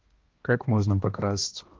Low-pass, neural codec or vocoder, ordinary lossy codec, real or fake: 7.2 kHz; codec, 16 kHz, 1 kbps, X-Codec, HuBERT features, trained on balanced general audio; Opus, 16 kbps; fake